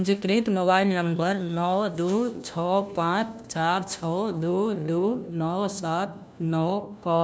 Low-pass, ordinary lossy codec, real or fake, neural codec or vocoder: none; none; fake; codec, 16 kHz, 1 kbps, FunCodec, trained on LibriTTS, 50 frames a second